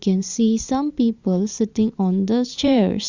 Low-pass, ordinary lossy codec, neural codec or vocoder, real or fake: 7.2 kHz; Opus, 64 kbps; vocoder, 44.1 kHz, 128 mel bands every 512 samples, BigVGAN v2; fake